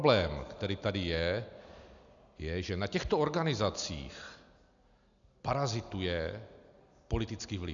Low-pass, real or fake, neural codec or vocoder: 7.2 kHz; real; none